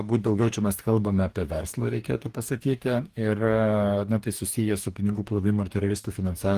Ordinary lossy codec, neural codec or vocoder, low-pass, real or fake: Opus, 24 kbps; codec, 44.1 kHz, 2.6 kbps, DAC; 14.4 kHz; fake